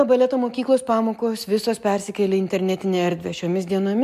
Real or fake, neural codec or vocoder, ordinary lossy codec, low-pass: real; none; AAC, 96 kbps; 14.4 kHz